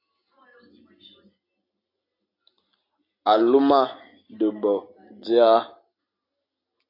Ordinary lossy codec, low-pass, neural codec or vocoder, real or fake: AAC, 32 kbps; 5.4 kHz; none; real